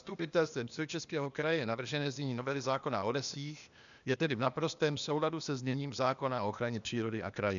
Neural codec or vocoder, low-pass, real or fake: codec, 16 kHz, 0.8 kbps, ZipCodec; 7.2 kHz; fake